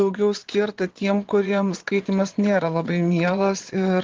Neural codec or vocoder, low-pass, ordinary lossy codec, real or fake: vocoder, 22.05 kHz, 80 mel bands, Vocos; 7.2 kHz; Opus, 16 kbps; fake